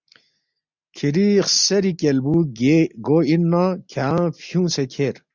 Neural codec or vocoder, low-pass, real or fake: none; 7.2 kHz; real